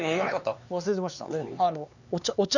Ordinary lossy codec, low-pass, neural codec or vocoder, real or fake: none; 7.2 kHz; codec, 16 kHz, 4 kbps, X-Codec, HuBERT features, trained on LibriSpeech; fake